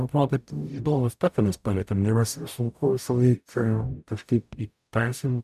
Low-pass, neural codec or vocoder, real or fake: 14.4 kHz; codec, 44.1 kHz, 0.9 kbps, DAC; fake